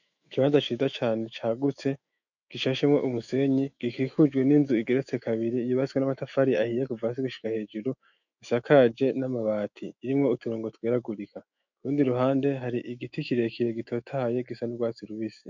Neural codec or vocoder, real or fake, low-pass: autoencoder, 48 kHz, 128 numbers a frame, DAC-VAE, trained on Japanese speech; fake; 7.2 kHz